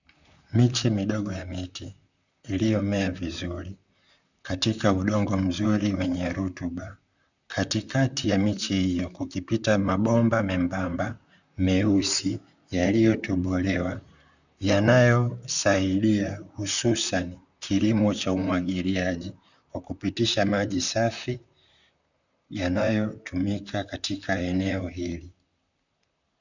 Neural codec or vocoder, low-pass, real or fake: vocoder, 44.1 kHz, 128 mel bands, Pupu-Vocoder; 7.2 kHz; fake